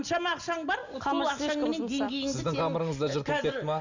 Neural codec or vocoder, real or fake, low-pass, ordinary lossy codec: none; real; 7.2 kHz; Opus, 64 kbps